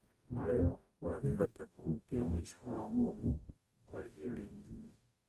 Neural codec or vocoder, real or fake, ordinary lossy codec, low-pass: codec, 44.1 kHz, 0.9 kbps, DAC; fake; Opus, 32 kbps; 14.4 kHz